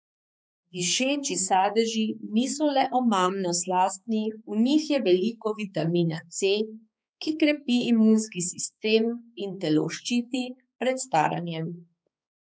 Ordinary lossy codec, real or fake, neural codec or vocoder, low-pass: none; fake; codec, 16 kHz, 4 kbps, X-Codec, HuBERT features, trained on balanced general audio; none